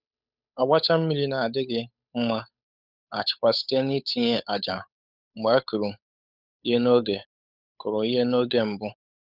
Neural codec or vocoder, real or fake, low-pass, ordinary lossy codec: codec, 16 kHz, 8 kbps, FunCodec, trained on Chinese and English, 25 frames a second; fake; 5.4 kHz; none